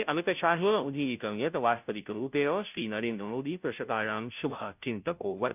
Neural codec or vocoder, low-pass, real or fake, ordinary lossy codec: codec, 16 kHz, 0.5 kbps, FunCodec, trained on Chinese and English, 25 frames a second; 3.6 kHz; fake; none